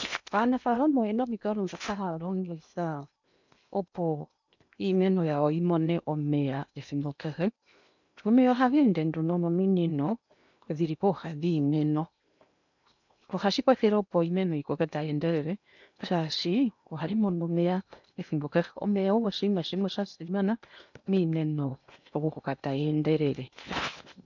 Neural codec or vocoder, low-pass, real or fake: codec, 16 kHz in and 24 kHz out, 0.8 kbps, FocalCodec, streaming, 65536 codes; 7.2 kHz; fake